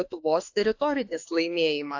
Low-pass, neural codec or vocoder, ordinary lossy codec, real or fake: 7.2 kHz; autoencoder, 48 kHz, 32 numbers a frame, DAC-VAE, trained on Japanese speech; AAC, 48 kbps; fake